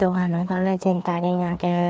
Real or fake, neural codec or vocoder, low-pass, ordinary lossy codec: fake; codec, 16 kHz, 2 kbps, FreqCodec, larger model; none; none